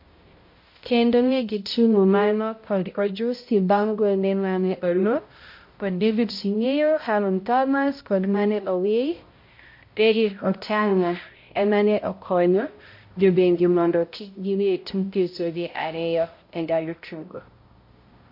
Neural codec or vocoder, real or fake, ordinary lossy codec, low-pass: codec, 16 kHz, 0.5 kbps, X-Codec, HuBERT features, trained on balanced general audio; fake; MP3, 32 kbps; 5.4 kHz